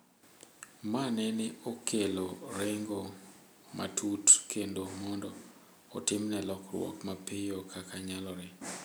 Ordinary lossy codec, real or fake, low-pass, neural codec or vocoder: none; real; none; none